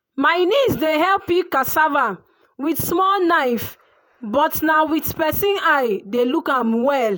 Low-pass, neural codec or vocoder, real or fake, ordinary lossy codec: none; vocoder, 48 kHz, 128 mel bands, Vocos; fake; none